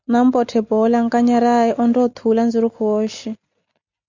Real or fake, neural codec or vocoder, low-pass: real; none; 7.2 kHz